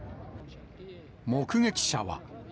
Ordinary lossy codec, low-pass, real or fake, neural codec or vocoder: none; none; real; none